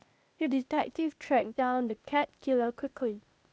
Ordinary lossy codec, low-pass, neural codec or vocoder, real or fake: none; none; codec, 16 kHz, 0.8 kbps, ZipCodec; fake